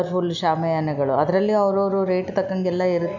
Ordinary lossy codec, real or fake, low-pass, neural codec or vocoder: none; real; 7.2 kHz; none